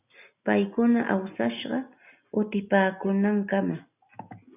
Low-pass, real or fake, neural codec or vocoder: 3.6 kHz; real; none